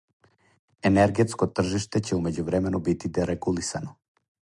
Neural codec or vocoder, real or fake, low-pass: none; real; 10.8 kHz